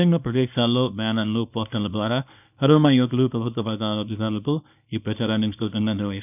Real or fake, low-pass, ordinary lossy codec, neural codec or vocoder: fake; 3.6 kHz; none; codec, 24 kHz, 0.9 kbps, WavTokenizer, small release